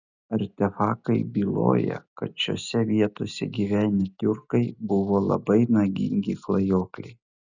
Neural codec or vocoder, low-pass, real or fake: none; 7.2 kHz; real